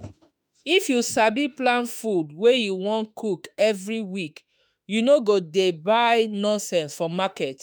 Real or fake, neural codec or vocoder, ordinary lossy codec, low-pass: fake; autoencoder, 48 kHz, 32 numbers a frame, DAC-VAE, trained on Japanese speech; none; none